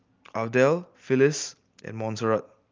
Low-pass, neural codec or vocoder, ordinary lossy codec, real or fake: 7.2 kHz; none; Opus, 24 kbps; real